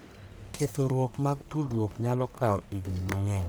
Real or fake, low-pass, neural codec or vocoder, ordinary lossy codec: fake; none; codec, 44.1 kHz, 1.7 kbps, Pupu-Codec; none